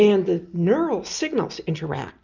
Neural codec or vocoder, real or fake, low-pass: none; real; 7.2 kHz